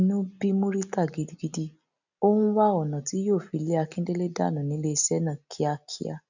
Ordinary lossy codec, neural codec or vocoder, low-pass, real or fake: none; none; 7.2 kHz; real